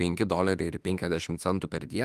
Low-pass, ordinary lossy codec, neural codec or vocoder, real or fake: 14.4 kHz; Opus, 32 kbps; autoencoder, 48 kHz, 32 numbers a frame, DAC-VAE, trained on Japanese speech; fake